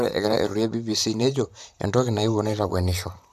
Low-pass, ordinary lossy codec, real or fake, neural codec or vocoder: 14.4 kHz; none; fake; vocoder, 44.1 kHz, 128 mel bands, Pupu-Vocoder